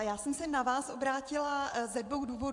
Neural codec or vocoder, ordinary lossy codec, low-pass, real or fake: vocoder, 24 kHz, 100 mel bands, Vocos; AAC, 64 kbps; 10.8 kHz; fake